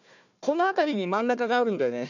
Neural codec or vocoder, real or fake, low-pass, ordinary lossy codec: codec, 16 kHz, 1 kbps, FunCodec, trained on Chinese and English, 50 frames a second; fake; 7.2 kHz; none